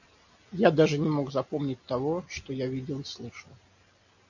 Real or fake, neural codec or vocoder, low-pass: real; none; 7.2 kHz